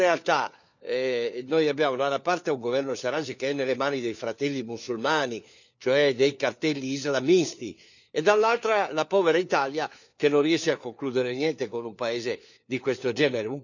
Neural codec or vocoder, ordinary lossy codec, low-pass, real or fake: codec, 16 kHz, 4 kbps, FunCodec, trained on LibriTTS, 50 frames a second; none; 7.2 kHz; fake